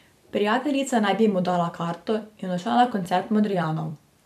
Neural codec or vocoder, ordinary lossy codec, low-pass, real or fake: vocoder, 44.1 kHz, 128 mel bands every 256 samples, BigVGAN v2; none; 14.4 kHz; fake